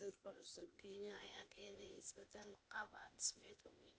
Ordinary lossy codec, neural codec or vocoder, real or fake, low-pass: none; codec, 16 kHz, 0.8 kbps, ZipCodec; fake; none